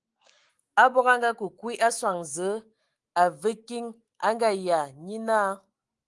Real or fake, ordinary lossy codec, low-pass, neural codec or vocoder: fake; Opus, 24 kbps; 10.8 kHz; autoencoder, 48 kHz, 128 numbers a frame, DAC-VAE, trained on Japanese speech